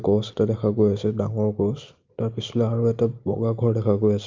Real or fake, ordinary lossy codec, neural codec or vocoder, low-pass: real; Opus, 24 kbps; none; 7.2 kHz